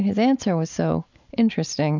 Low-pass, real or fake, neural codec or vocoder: 7.2 kHz; real; none